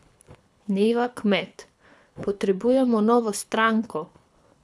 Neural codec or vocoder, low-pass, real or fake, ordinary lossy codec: codec, 24 kHz, 6 kbps, HILCodec; none; fake; none